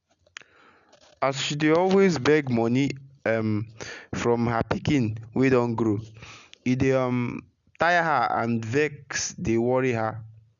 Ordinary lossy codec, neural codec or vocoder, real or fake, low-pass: none; none; real; 7.2 kHz